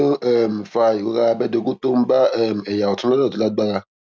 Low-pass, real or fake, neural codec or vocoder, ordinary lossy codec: none; real; none; none